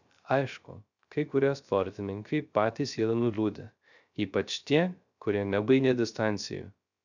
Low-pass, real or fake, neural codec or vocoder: 7.2 kHz; fake; codec, 16 kHz, 0.3 kbps, FocalCodec